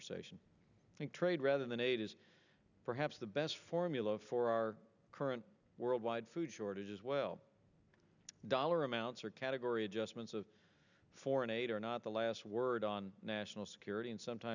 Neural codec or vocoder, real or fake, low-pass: none; real; 7.2 kHz